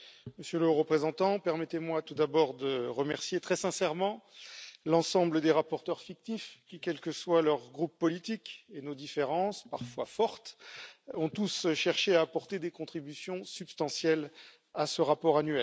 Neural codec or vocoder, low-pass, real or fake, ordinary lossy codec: none; none; real; none